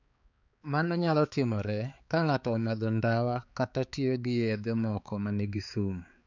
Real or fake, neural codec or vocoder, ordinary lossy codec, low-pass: fake; codec, 16 kHz, 4 kbps, X-Codec, HuBERT features, trained on balanced general audio; none; 7.2 kHz